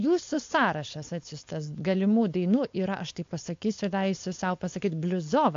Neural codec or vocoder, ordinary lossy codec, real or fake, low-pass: codec, 16 kHz, 4.8 kbps, FACodec; AAC, 48 kbps; fake; 7.2 kHz